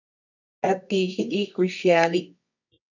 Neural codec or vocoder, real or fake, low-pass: codec, 24 kHz, 0.9 kbps, WavTokenizer, medium music audio release; fake; 7.2 kHz